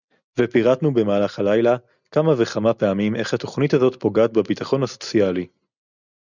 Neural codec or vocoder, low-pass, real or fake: none; 7.2 kHz; real